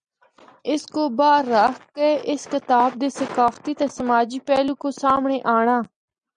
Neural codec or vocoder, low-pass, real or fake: none; 9.9 kHz; real